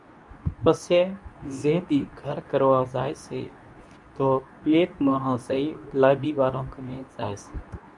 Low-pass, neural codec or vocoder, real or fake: 10.8 kHz; codec, 24 kHz, 0.9 kbps, WavTokenizer, medium speech release version 2; fake